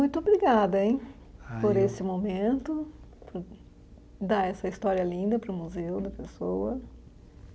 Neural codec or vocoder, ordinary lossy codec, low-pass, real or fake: none; none; none; real